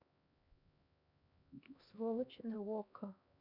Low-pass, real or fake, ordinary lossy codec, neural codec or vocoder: 5.4 kHz; fake; none; codec, 16 kHz, 1 kbps, X-Codec, HuBERT features, trained on LibriSpeech